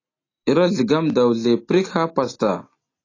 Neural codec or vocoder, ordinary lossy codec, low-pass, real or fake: none; AAC, 32 kbps; 7.2 kHz; real